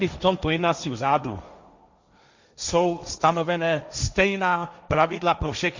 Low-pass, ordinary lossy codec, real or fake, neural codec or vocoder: 7.2 kHz; Opus, 64 kbps; fake; codec, 16 kHz, 1.1 kbps, Voila-Tokenizer